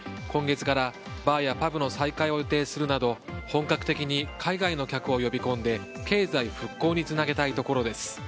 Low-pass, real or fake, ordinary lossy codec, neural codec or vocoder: none; real; none; none